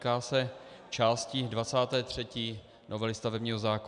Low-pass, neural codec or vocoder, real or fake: 10.8 kHz; none; real